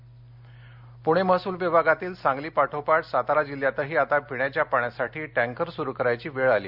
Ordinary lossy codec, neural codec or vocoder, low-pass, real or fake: Opus, 64 kbps; none; 5.4 kHz; real